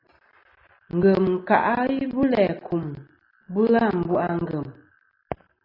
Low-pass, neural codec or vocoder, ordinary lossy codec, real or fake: 5.4 kHz; none; AAC, 24 kbps; real